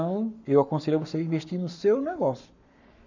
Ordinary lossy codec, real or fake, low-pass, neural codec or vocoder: none; fake; 7.2 kHz; codec, 44.1 kHz, 7.8 kbps, Pupu-Codec